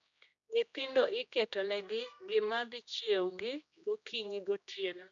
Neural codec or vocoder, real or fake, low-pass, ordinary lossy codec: codec, 16 kHz, 1 kbps, X-Codec, HuBERT features, trained on general audio; fake; 7.2 kHz; MP3, 64 kbps